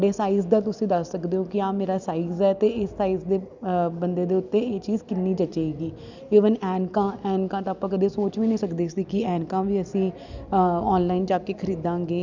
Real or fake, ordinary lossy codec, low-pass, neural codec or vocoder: real; none; 7.2 kHz; none